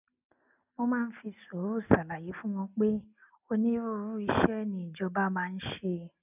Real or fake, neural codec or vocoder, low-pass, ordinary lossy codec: real; none; 3.6 kHz; none